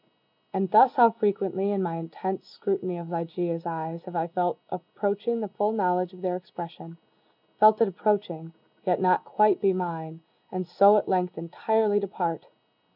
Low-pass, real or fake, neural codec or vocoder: 5.4 kHz; real; none